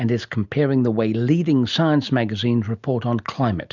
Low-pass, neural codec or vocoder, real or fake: 7.2 kHz; none; real